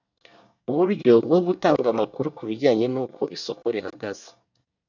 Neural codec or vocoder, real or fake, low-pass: codec, 24 kHz, 1 kbps, SNAC; fake; 7.2 kHz